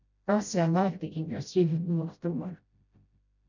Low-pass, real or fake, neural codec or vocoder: 7.2 kHz; fake; codec, 16 kHz, 0.5 kbps, FreqCodec, smaller model